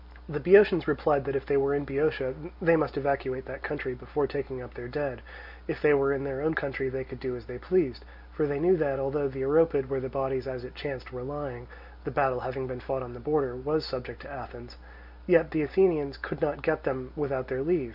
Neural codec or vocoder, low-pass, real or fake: none; 5.4 kHz; real